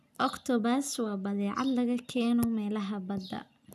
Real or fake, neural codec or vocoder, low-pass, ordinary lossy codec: real; none; 14.4 kHz; none